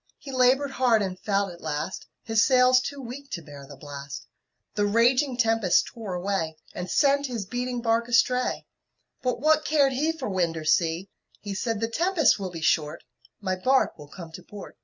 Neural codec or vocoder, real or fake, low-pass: none; real; 7.2 kHz